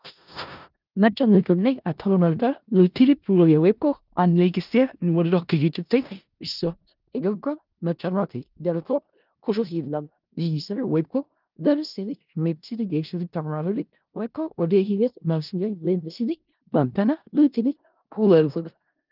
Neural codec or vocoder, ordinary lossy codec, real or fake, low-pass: codec, 16 kHz in and 24 kHz out, 0.4 kbps, LongCat-Audio-Codec, four codebook decoder; Opus, 24 kbps; fake; 5.4 kHz